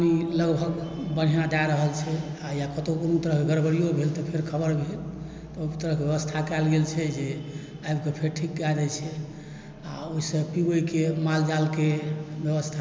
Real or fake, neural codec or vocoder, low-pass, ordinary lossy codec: real; none; none; none